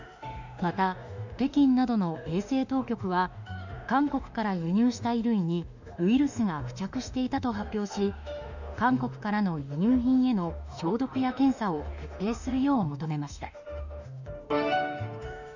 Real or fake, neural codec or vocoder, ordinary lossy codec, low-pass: fake; autoencoder, 48 kHz, 32 numbers a frame, DAC-VAE, trained on Japanese speech; none; 7.2 kHz